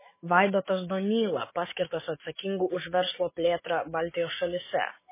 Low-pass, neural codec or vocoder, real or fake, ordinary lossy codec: 3.6 kHz; autoencoder, 48 kHz, 128 numbers a frame, DAC-VAE, trained on Japanese speech; fake; MP3, 16 kbps